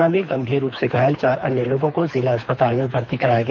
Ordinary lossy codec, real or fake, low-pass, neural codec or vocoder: MP3, 64 kbps; fake; 7.2 kHz; codec, 24 kHz, 3 kbps, HILCodec